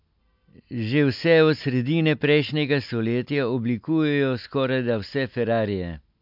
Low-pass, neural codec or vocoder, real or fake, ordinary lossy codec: 5.4 kHz; none; real; MP3, 48 kbps